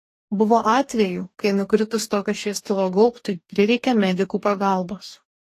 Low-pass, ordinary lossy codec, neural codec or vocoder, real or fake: 14.4 kHz; AAC, 48 kbps; codec, 44.1 kHz, 2.6 kbps, DAC; fake